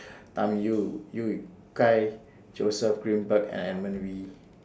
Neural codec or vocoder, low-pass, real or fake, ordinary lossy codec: none; none; real; none